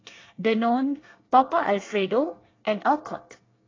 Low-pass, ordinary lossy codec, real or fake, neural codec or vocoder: 7.2 kHz; AAC, 32 kbps; fake; codec, 24 kHz, 1 kbps, SNAC